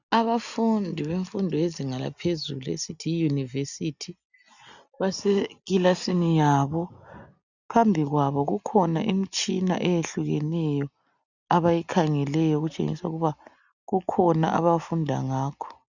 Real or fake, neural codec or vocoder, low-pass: real; none; 7.2 kHz